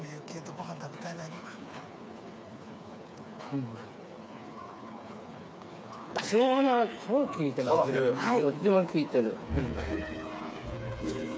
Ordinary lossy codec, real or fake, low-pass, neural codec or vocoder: none; fake; none; codec, 16 kHz, 4 kbps, FreqCodec, smaller model